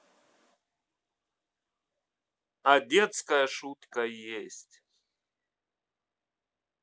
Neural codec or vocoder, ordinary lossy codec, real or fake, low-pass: none; none; real; none